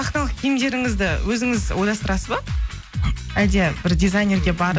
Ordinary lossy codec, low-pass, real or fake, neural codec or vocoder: none; none; real; none